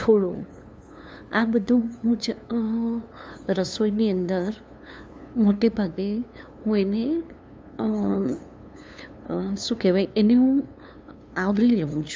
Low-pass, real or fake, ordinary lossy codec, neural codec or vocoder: none; fake; none; codec, 16 kHz, 2 kbps, FunCodec, trained on LibriTTS, 25 frames a second